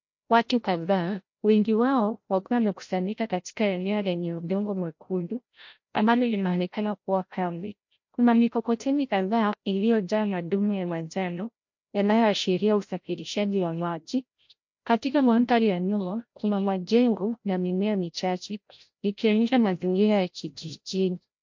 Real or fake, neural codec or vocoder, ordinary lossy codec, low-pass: fake; codec, 16 kHz, 0.5 kbps, FreqCodec, larger model; MP3, 48 kbps; 7.2 kHz